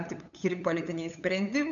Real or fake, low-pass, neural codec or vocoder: fake; 7.2 kHz; codec, 16 kHz, 8 kbps, FunCodec, trained on LibriTTS, 25 frames a second